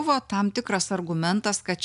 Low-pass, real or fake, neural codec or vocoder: 10.8 kHz; real; none